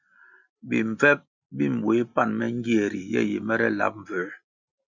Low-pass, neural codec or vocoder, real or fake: 7.2 kHz; none; real